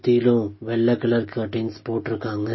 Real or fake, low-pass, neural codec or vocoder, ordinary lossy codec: real; 7.2 kHz; none; MP3, 24 kbps